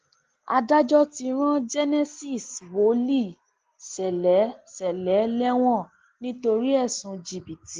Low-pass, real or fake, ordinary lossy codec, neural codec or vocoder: 7.2 kHz; real; Opus, 16 kbps; none